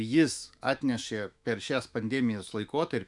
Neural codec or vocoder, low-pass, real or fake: autoencoder, 48 kHz, 128 numbers a frame, DAC-VAE, trained on Japanese speech; 10.8 kHz; fake